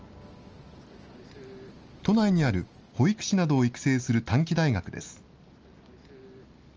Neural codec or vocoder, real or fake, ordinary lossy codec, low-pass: none; real; Opus, 24 kbps; 7.2 kHz